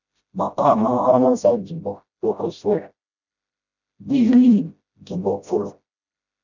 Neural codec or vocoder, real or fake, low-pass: codec, 16 kHz, 0.5 kbps, FreqCodec, smaller model; fake; 7.2 kHz